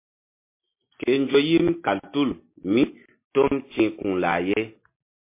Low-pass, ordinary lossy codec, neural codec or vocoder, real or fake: 3.6 kHz; MP3, 24 kbps; none; real